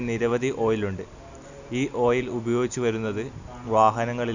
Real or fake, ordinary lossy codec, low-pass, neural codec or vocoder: real; none; 7.2 kHz; none